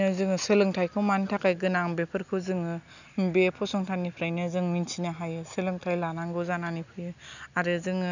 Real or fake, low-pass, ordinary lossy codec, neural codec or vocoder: real; 7.2 kHz; none; none